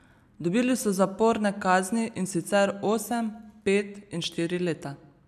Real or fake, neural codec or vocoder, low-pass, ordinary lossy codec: real; none; 14.4 kHz; none